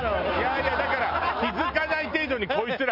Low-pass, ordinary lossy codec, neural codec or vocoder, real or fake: 5.4 kHz; none; none; real